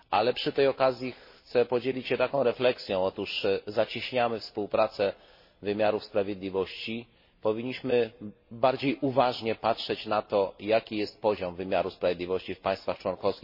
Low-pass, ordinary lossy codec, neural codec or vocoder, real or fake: 5.4 kHz; MP3, 24 kbps; none; real